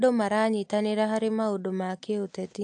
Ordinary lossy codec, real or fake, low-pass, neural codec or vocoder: AAC, 64 kbps; real; 9.9 kHz; none